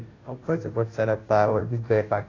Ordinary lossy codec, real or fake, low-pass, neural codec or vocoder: AAC, 32 kbps; fake; 7.2 kHz; codec, 16 kHz, 0.5 kbps, FunCodec, trained on Chinese and English, 25 frames a second